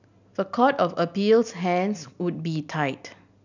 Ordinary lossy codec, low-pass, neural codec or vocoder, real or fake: none; 7.2 kHz; none; real